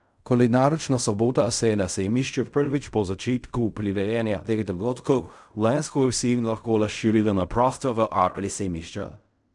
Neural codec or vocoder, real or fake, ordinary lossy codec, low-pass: codec, 16 kHz in and 24 kHz out, 0.4 kbps, LongCat-Audio-Codec, fine tuned four codebook decoder; fake; none; 10.8 kHz